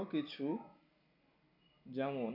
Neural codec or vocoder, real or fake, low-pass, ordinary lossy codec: none; real; 5.4 kHz; none